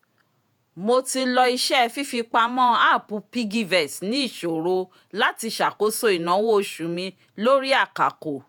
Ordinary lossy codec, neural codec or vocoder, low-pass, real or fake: none; vocoder, 48 kHz, 128 mel bands, Vocos; none; fake